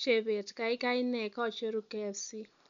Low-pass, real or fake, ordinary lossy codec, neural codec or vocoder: 7.2 kHz; real; none; none